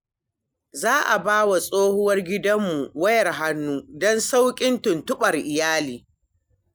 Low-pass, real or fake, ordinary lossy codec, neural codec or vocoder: none; real; none; none